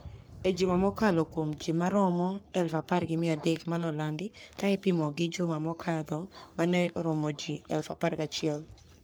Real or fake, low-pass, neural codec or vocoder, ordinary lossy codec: fake; none; codec, 44.1 kHz, 3.4 kbps, Pupu-Codec; none